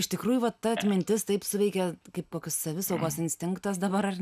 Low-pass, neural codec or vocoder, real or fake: 14.4 kHz; none; real